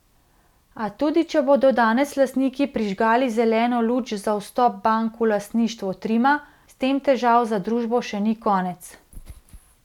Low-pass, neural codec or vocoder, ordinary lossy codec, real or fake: 19.8 kHz; none; none; real